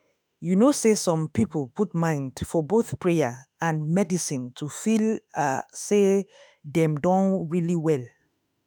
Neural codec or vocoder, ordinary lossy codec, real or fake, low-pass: autoencoder, 48 kHz, 32 numbers a frame, DAC-VAE, trained on Japanese speech; none; fake; none